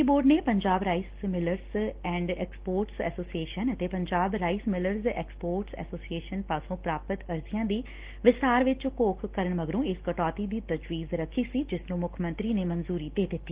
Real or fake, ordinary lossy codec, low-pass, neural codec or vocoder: real; Opus, 16 kbps; 3.6 kHz; none